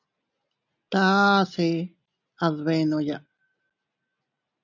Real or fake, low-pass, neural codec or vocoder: real; 7.2 kHz; none